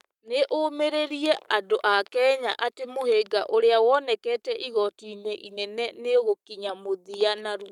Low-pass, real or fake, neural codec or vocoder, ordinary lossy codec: 19.8 kHz; fake; codec, 44.1 kHz, 7.8 kbps, Pupu-Codec; none